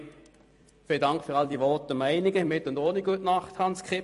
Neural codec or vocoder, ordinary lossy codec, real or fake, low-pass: vocoder, 44.1 kHz, 128 mel bands every 256 samples, BigVGAN v2; MP3, 48 kbps; fake; 14.4 kHz